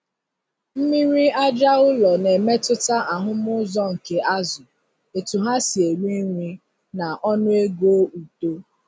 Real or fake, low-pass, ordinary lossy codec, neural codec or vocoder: real; none; none; none